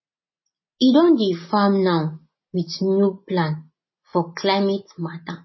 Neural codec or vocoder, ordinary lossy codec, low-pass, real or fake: none; MP3, 24 kbps; 7.2 kHz; real